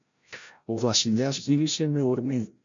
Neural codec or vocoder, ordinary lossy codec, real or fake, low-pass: codec, 16 kHz, 0.5 kbps, FreqCodec, larger model; MP3, 64 kbps; fake; 7.2 kHz